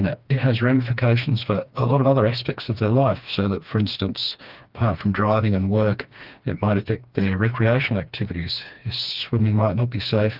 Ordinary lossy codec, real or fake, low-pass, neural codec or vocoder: Opus, 32 kbps; fake; 5.4 kHz; codec, 16 kHz, 2 kbps, FreqCodec, smaller model